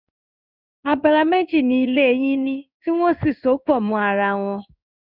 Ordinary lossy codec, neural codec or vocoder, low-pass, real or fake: none; codec, 16 kHz in and 24 kHz out, 1 kbps, XY-Tokenizer; 5.4 kHz; fake